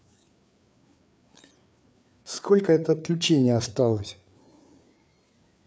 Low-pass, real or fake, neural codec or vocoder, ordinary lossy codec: none; fake; codec, 16 kHz, 4 kbps, FreqCodec, larger model; none